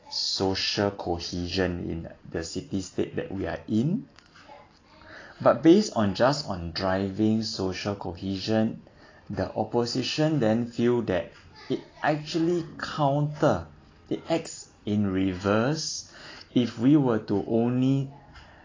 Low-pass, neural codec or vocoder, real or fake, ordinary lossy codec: 7.2 kHz; none; real; AAC, 32 kbps